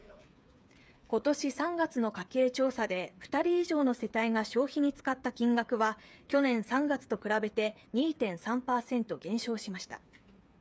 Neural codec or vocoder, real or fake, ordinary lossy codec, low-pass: codec, 16 kHz, 8 kbps, FreqCodec, smaller model; fake; none; none